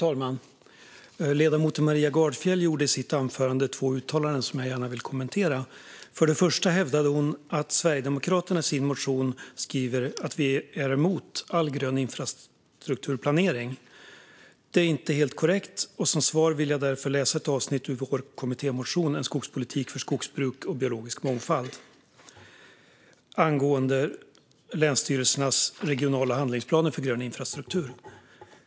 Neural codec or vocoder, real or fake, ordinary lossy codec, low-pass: none; real; none; none